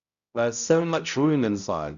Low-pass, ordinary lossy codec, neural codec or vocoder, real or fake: 7.2 kHz; none; codec, 16 kHz, 0.5 kbps, X-Codec, HuBERT features, trained on balanced general audio; fake